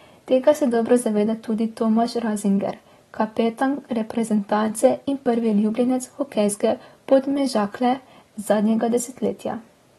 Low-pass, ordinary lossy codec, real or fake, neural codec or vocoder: 19.8 kHz; AAC, 32 kbps; real; none